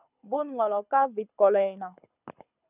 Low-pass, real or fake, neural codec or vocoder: 3.6 kHz; fake; codec, 24 kHz, 6 kbps, HILCodec